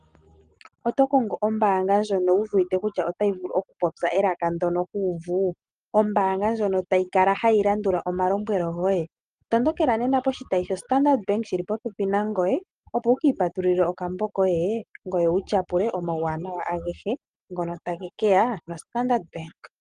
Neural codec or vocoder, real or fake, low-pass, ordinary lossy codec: none; real; 9.9 kHz; Opus, 32 kbps